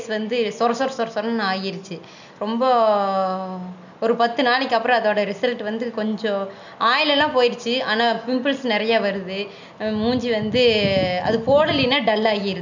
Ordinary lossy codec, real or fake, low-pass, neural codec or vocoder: none; real; 7.2 kHz; none